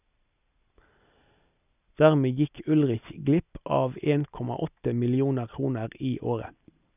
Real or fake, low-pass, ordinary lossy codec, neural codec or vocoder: real; 3.6 kHz; none; none